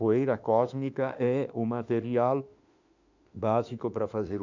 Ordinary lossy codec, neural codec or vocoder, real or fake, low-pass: none; autoencoder, 48 kHz, 32 numbers a frame, DAC-VAE, trained on Japanese speech; fake; 7.2 kHz